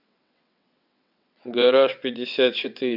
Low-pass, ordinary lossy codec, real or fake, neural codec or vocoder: 5.4 kHz; none; fake; vocoder, 22.05 kHz, 80 mel bands, WaveNeXt